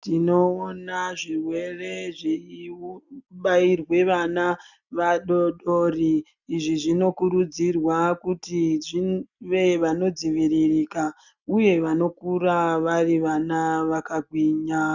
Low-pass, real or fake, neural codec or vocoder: 7.2 kHz; real; none